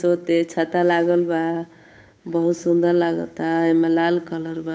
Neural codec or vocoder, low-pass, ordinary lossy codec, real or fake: codec, 16 kHz, 8 kbps, FunCodec, trained on Chinese and English, 25 frames a second; none; none; fake